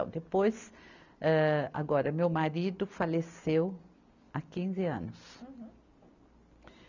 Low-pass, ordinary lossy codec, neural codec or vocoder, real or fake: 7.2 kHz; none; none; real